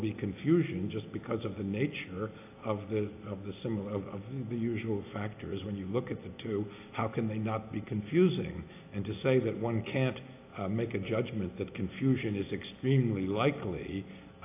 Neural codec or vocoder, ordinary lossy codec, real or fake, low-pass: none; AAC, 24 kbps; real; 3.6 kHz